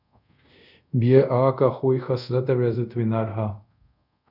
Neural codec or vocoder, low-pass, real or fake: codec, 24 kHz, 0.5 kbps, DualCodec; 5.4 kHz; fake